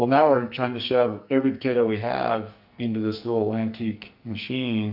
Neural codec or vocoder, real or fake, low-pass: codec, 44.1 kHz, 2.6 kbps, SNAC; fake; 5.4 kHz